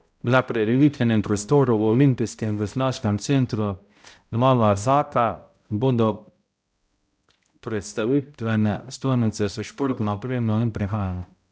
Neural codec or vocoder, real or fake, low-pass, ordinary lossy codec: codec, 16 kHz, 0.5 kbps, X-Codec, HuBERT features, trained on balanced general audio; fake; none; none